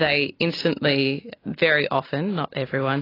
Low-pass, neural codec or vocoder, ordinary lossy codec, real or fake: 5.4 kHz; none; AAC, 24 kbps; real